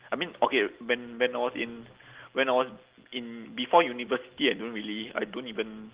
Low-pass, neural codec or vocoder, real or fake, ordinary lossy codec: 3.6 kHz; none; real; Opus, 16 kbps